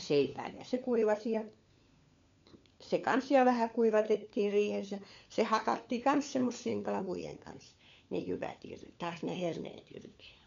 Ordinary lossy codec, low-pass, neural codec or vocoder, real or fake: MP3, 64 kbps; 7.2 kHz; codec, 16 kHz, 4 kbps, FunCodec, trained on LibriTTS, 50 frames a second; fake